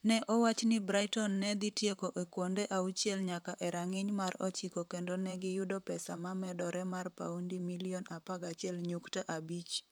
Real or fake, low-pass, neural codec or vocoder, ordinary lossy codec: fake; none; vocoder, 44.1 kHz, 128 mel bands, Pupu-Vocoder; none